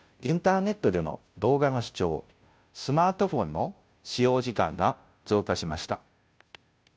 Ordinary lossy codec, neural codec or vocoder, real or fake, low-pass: none; codec, 16 kHz, 0.5 kbps, FunCodec, trained on Chinese and English, 25 frames a second; fake; none